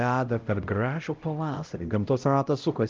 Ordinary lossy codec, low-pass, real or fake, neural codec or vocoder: Opus, 24 kbps; 7.2 kHz; fake; codec, 16 kHz, 0.5 kbps, X-Codec, HuBERT features, trained on LibriSpeech